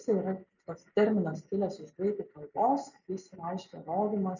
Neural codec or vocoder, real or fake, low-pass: none; real; 7.2 kHz